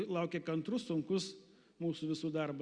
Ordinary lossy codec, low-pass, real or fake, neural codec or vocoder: Opus, 64 kbps; 9.9 kHz; real; none